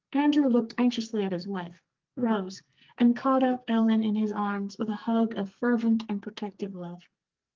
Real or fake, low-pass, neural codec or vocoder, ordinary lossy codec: fake; 7.2 kHz; codec, 44.1 kHz, 2.6 kbps, SNAC; Opus, 32 kbps